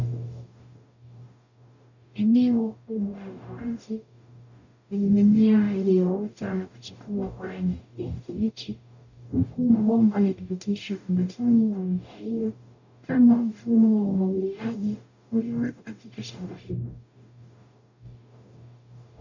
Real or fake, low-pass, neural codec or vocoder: fake; 7.2 kHz; codec, 44.1 kHz, 0.9 kbps, DAC